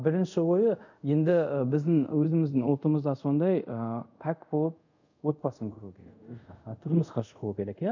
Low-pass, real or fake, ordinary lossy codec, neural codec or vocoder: 7.2 kHz; fake; none; codec, 24 kHz, 0.5 kbps, DualCodec